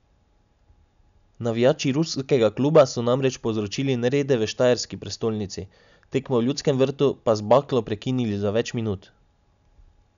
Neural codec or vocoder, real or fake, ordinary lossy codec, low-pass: none; real; none; 7.2 kHz